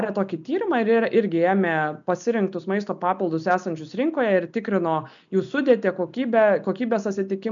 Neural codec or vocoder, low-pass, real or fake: none; 7.2 kHz; real